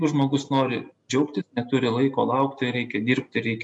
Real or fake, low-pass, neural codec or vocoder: real; 10.8 kHz; none